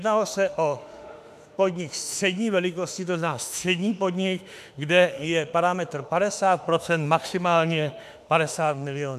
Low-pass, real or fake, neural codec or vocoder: 14.4 kHz; fake; autoencoder, 48 kHz, 32 numbers a frame, DAC-VAE, trained on Japanese speech